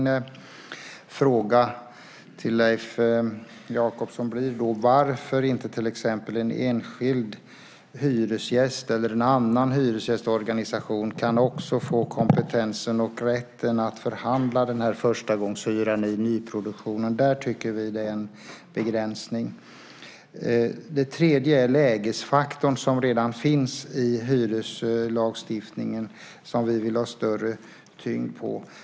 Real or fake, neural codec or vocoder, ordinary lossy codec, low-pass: real; none; none; none